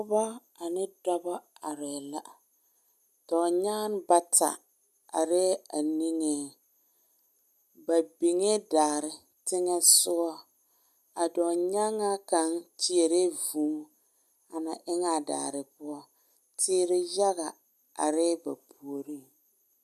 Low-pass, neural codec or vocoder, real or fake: 14.4 kHz; none; real